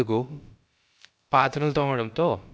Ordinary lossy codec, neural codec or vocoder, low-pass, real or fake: none; codec, 16 kHz, about 1 kbps, DyCAST, with the encoder's durations; none; fake